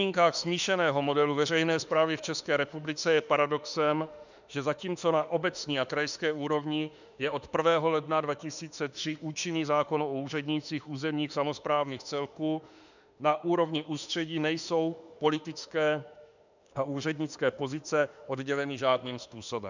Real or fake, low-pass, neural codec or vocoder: fake; 7.2 kHz; autoencoder, 48 kHz, 32 numbers a frame, DAC-VAE, trained on Japanese speech